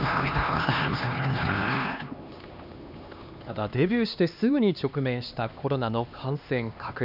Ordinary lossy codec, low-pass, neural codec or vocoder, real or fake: none; 5.4 kHz; codec, 16 kHz, 2 kbps, X-Codec, HuBERT features, trained on LibriSpeech; fake